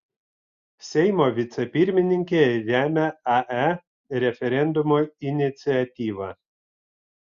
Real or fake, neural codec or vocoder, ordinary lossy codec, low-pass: real; none; Opus, 64 kbps; 7.2 kHz